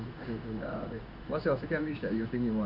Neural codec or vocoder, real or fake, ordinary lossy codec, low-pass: codec, 16 kHz in and 24 kHz out, 1 kbps, XY-Tokenizer; fake; none; 5.4 kHz